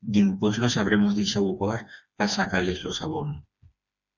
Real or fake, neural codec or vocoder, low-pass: fake; codec, 16 kHz, 2 kbps, FreqCodec, smaller model; 7.2 kHz